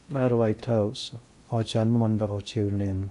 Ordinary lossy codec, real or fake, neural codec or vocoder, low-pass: AAC, 64 kbps; fake; codec, 16 kHz in and 24 kHz out, 0.6 kbps, FocalCodec, streaming, 2048 codes; 10.8 kHz